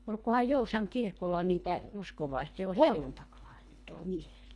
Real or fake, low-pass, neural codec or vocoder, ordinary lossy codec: fake; none; codec, 24 kHz, 1.5 kbps, HILCodec; none